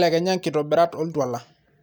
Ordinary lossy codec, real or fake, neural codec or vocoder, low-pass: none; real; none; none